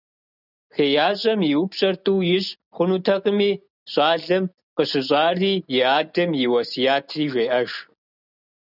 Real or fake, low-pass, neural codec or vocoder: real; 5.4 kHz; none